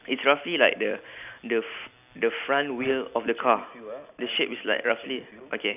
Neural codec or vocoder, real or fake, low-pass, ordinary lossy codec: none; real; 3.6 kHz; none